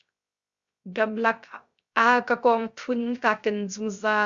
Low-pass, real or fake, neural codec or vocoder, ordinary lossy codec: 7.2 kHz; fake; codec, 16 kHz, 0.3 kbps, FocalCodec; Opus, 64 kbps